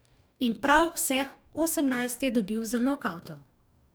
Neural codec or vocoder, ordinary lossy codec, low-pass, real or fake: codec, 44.1 kHz, 2.6 kbps, DAC; none; none; fake